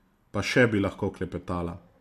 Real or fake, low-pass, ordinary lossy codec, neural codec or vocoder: real; 14.4 kHz; MP3, 64 kbps; none